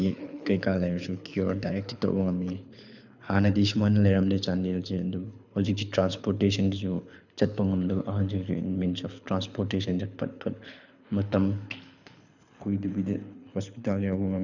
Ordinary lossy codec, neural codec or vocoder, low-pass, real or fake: none; codec, 24 kHz, 6 kbps, HILCodec; 7.2 kHz; fake